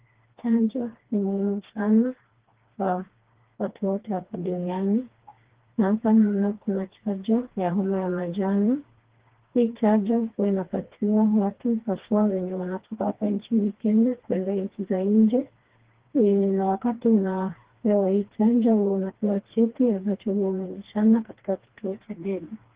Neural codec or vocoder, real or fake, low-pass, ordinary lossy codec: codec, 16 kHz, 2 kbps, FreqCodec, smaller model; fake; 3.6 kHz; Opus, 16 kbps